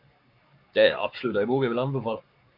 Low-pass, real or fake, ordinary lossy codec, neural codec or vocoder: 5.4 kHz; fake; MP3, 48 kbps; codec, 44.1 kHz, 7.8 kbps, Pupu-Codec